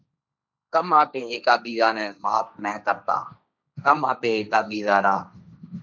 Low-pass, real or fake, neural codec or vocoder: 7.2 kHz; fake; codec, 16 kHz, 1.1 kbps, Voila-Tokenizer